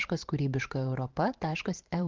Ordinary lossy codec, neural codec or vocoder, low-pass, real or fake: Opus, 16 kbps; vocoder, 44.1 kHz, 128 mel bands every 512 samples, BigVGAN v2; 7.2 kHz; fake